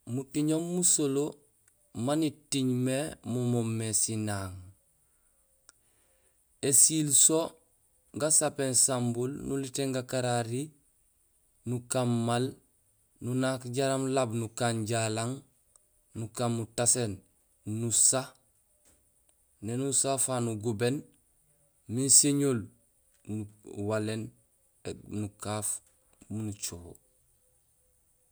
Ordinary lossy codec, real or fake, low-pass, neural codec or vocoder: none; real; none; none